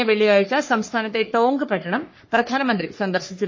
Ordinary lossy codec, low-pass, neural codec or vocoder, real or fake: MP3, 32 kbps; 7.2 kHz; codec, 44.1 kHz, 3.4 kbps, Pupu-Codec; fake